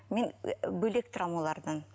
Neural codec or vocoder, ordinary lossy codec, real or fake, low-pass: none; none; real; none